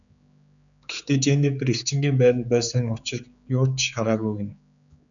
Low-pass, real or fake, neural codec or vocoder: 7.2 kHz; fake; codec, 16 kHz, 4 kbps, X-Codec, HuBERT features, trained on general audio